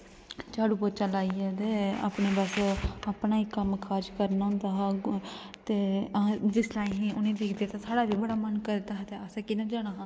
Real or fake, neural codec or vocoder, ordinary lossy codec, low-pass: real; none; none; none